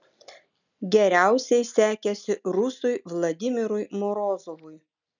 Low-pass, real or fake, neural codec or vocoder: 7.2 kHz; real; none